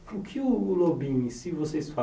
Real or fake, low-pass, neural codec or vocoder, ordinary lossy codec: real; none; none; none